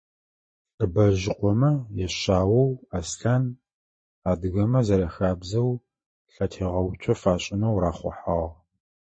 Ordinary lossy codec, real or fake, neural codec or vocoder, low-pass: MP3, 32 kbps; fake; vocoder, 44.1 kHz, 128 mel bands every 512 samples, BigVGAN v2; 9.9 kHz